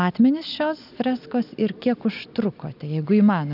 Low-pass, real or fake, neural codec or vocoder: 5.4 kHz; real; none